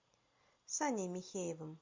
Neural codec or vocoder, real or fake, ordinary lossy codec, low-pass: none; real; MP3, 48 kbps; 7.2 kHz